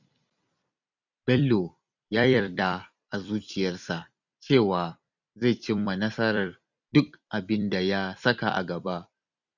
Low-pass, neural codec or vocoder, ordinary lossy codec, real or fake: 7.2 kHz; vocoder, 44.1 kHz, 128 mel bands every 256 samples, BigVGAN v2; none; fake